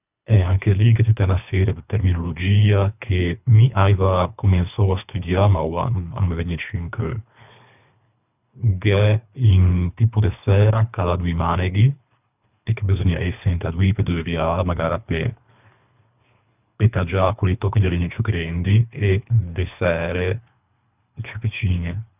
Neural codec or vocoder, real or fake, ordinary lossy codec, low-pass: codec, 24 kHz, 3 kbps, HILCodec; fake; none; 3.6 kHz